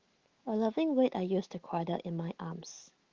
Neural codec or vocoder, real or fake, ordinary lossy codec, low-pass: autoencoder, 48 kHz, 128 numbers a frame, DAC-VAE, trained on Japanese speech; fake; Opus, 16 kbps; 7.2 kHz